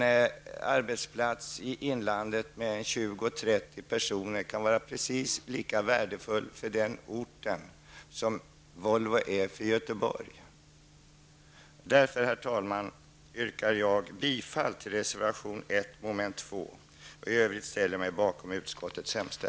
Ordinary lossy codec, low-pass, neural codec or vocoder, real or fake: none; none; none; real